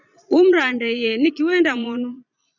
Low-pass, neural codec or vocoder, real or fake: 7.2 kHz; vocoder, 22.05 kHz, 80 mel bands, Vocos; fake